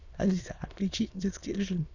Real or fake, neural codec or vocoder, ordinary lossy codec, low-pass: fake; autoencoder, 22.05 kHz, a latent of 192 numbers a frame, VITS, trained on many speakers; none; 7.2 kHz